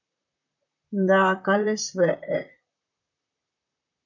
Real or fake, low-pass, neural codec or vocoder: fake; 7.2 kHz; vocoder, 44.1 kHz, 128 mel bands, Pupu-Vocoder